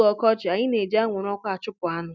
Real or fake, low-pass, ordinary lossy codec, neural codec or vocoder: real; none; none; none